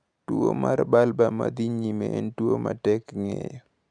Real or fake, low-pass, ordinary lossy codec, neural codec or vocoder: real; 9.9 kHz; none; none